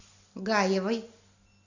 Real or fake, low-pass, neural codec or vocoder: real; 7.2 kHz; none